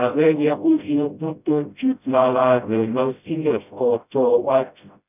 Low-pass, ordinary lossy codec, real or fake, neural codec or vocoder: 3.6 kHz; none; fake; codec, 16 kHz, 0.5 kbps, FreqCodec, smaller model